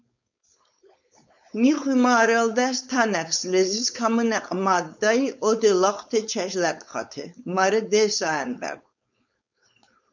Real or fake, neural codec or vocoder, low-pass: fake; codec, 16 kHz, 4.8 kbps, FACodec; 7.2 kHz